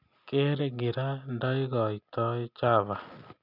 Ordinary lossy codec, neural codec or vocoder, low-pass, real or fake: none; none; 5.4 kHz; real